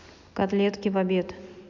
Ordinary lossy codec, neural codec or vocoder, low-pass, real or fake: MP3, 64 kbps; none; 7.2 kHz; real